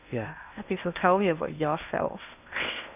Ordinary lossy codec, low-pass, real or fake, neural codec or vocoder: none; 3.6 kHz; fake; codec, 16 kHz in and 24 kHz out, 0.6 kbps, FocalCodec, streaming, 2048 codes